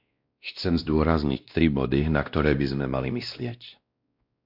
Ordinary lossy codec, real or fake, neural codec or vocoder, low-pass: MP3, 48 kbps; fake; codec, 16 kHz, 1 kbps, X-Codec, WavLM features, trained on Multilingual LibriSpeech; 5.4 kHz